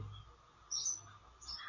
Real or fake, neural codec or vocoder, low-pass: real; none; 7.2 kHz